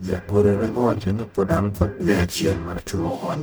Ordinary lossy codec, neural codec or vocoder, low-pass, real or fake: none; codec, 44.1 kHz, 0.9 kbps, DAC; none; fake